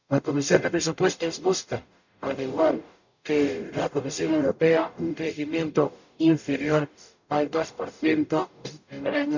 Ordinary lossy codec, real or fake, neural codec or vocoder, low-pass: none; fake; codec, 44.1 kHz, 0.9 kbps, DAC; 7.2 kHz